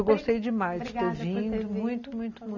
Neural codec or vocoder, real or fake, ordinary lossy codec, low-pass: none; real; none; 7.2 kHz